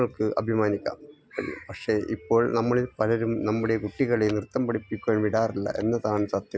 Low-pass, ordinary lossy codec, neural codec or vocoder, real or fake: none; none; none; real